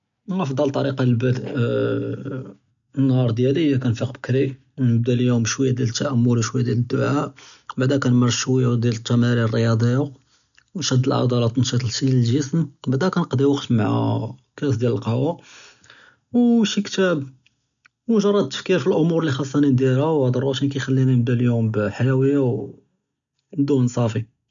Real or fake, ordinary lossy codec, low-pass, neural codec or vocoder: real; none; 7.2 kHz; none